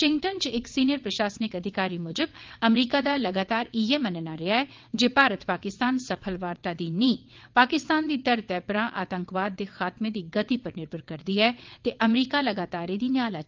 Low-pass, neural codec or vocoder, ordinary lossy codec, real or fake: 7.2 kHz; vocoder, 22.05 kHz, 80 mel bands, WaveNeXt; Opus, 32 kbps; fake